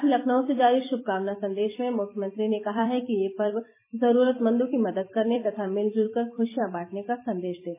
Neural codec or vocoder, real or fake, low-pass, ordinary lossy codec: vocoder, 44.1 kHz, 128 mel bands every 256 samples, BigVGAN v2; fake; 3.6 kHz; MP3, 16 kbps